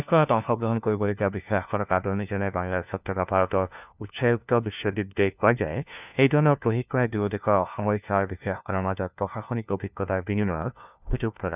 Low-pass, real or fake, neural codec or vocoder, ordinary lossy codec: 3.6 kHz; fake; codec, 16 kHz, 1 kbps, FunCodec, trained on LibriTTS, 50 frames a second; none